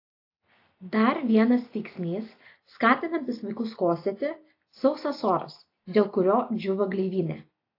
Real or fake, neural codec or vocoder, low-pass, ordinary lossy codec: real; none; 5.4 kHz; AAC, 32 kbps